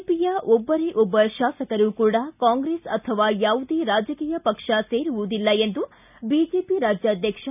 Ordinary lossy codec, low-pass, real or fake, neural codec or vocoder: none; 3.6 kHz; real; none